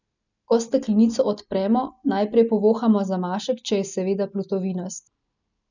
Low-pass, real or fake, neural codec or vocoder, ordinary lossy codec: 7.2 kHz; real; none; none